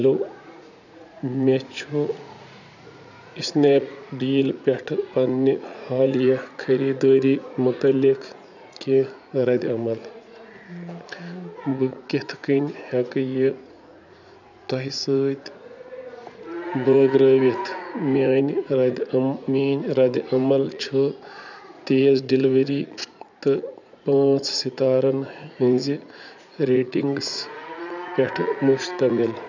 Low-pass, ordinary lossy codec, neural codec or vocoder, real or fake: 7.2 kHz; none; vocoder, 44.1 kHz, 80 mel bands, Vocos; fake